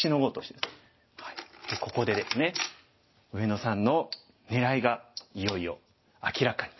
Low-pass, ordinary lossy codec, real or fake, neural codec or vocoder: 7.2 kHz; MP3, 24 kbps; real; none